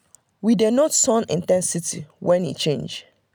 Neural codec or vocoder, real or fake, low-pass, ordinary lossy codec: none; real; none; none